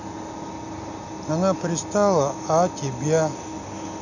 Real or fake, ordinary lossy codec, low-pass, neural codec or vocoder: real; none; 7.2 kHz; none